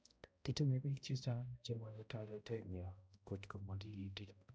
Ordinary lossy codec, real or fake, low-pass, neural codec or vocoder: none; fake; none; codec, 16 kHz, 0.5 kbps, X-Codec, HuBERT features, trained on balanced general audio